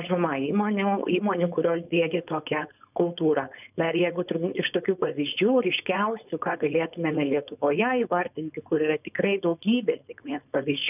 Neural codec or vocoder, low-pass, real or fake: codec, 16 kHz, 4.8 kbps, FACodec; 3.6 kHz; fake